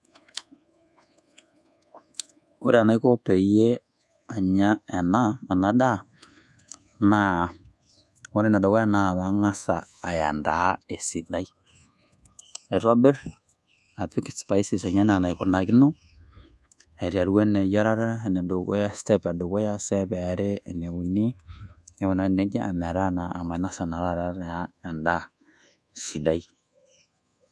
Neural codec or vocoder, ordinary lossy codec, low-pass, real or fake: codec, 24 kHz, 1.2 kbps, DualCodec; none; none; fake